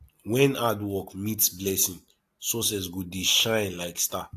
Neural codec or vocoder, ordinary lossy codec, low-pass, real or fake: none; AAC, 64 kbps; 14.4 kHz; real